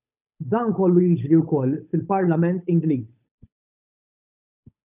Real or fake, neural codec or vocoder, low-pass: fake; codec, 16 kHz, 8 kbps, FunCodec, trained on Chinese and English, 25 frames a second; 3.6 kHz